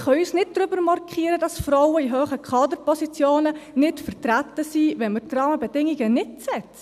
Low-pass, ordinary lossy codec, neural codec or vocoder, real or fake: 14.4 kHz; none; none; real